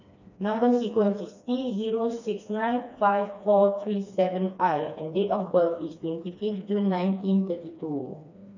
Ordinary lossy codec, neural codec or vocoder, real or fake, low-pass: none; codec, 16 kHz, 2 kbps, FreqCodec, smaller model; fake; 7.2 kHz